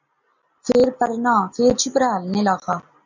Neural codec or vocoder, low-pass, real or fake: none; 7.2 kHz; real